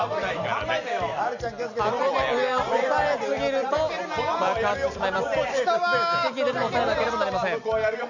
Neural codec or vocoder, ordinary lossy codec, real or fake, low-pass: none; none; real; 7.2 kHz